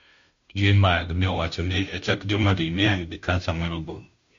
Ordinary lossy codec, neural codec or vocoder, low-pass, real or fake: MP3, 48 kbps; codec, 16 kHz, 0.5 kbps, FunCodec, trained on Chinese and English, 25 frames a second; 7.2 kHz; fake